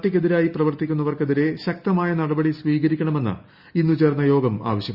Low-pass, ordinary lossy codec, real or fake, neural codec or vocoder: 5.4 kHz; Opus, 64 kbps; real; none